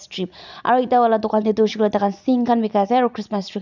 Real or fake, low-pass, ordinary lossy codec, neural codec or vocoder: real; 7.2 kHz; none; none